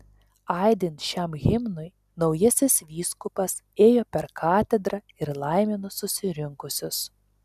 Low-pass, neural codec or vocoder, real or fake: 14.4 kHz; none; real